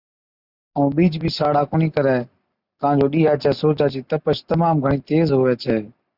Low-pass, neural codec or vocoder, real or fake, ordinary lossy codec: 5.4 kHz; none; real; Opus, 64 kbps